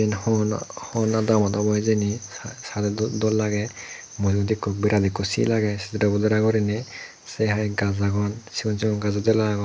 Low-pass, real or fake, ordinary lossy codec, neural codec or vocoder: none; real; none; none